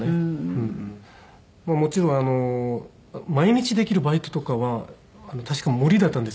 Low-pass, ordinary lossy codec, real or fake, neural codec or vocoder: none; none; real; none